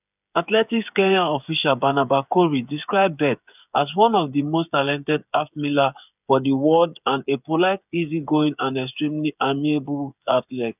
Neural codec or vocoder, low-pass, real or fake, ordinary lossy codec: codec, 16 kHz, 8 kbps, FreqCodec, smaller model; 3.6 kHz; fake; none